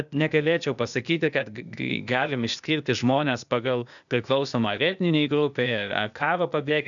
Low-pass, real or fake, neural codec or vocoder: 7.2 kHz; fake; codec, 16 kHz, 0.8 kbps, ZipCodec